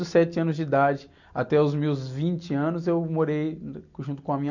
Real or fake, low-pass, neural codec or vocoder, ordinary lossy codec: real; 7.2 kHz; none; none